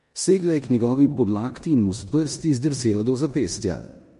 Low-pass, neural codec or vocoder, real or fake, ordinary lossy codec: 10.8 kHz; codec, 16 kHz in and 24 kHz out, 0.9 kbps, LongCat-Audio-Codec, four codebook decoder; fake; MP3, 48 kbps